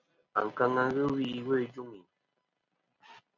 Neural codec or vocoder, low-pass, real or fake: none; 7.2 kHz; real